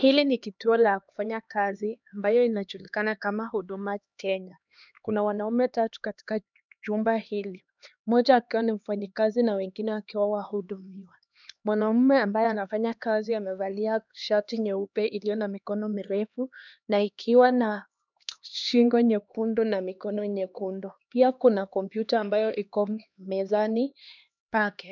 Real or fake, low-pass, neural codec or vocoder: fake; 7.2 kHz; codec, 16 kHz, 2 kbps, X-Codec, HuBERT features, trained on LibriSpeech